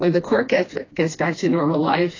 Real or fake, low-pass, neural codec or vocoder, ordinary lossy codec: fake; 7.2 kHz; codec, 16 kHz, 2 kbps, FreqCodec, smaller model; AAC, 32 kbps